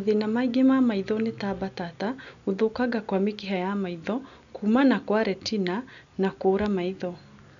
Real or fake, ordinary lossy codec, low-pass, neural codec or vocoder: real; none; 7.2 kHz; none